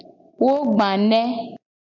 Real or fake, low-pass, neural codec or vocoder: real; 7.2 kHz; none